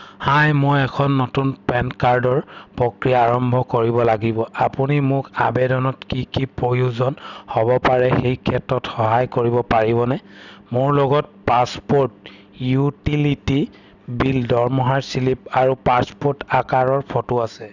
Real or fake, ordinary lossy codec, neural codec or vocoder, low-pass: real; none; none; 7.2 kHz